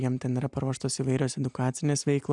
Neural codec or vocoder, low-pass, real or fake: none; 10.8 kHz; real